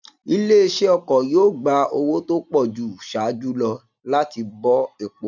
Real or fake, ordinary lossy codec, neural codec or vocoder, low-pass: real; none; none; 7.2 kHz